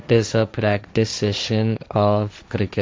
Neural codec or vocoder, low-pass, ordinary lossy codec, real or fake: codec, 16 kHz, 1.1 kbps, Voila-Tokenizer; none; none; fake